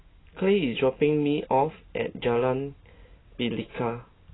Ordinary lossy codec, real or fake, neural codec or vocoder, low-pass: AAC, 16 kbps; real; none; 7.2 kHz